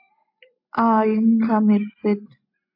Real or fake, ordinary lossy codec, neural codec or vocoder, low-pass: real; MP3, 32 kbps; none; 5.4 kHz